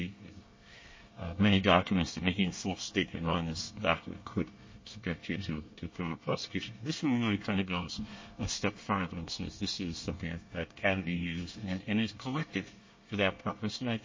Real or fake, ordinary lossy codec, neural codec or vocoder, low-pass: fake; MP3, 32 kbps; codec, 24 kHz, 1 kbps, SNAC; 7.2 kHz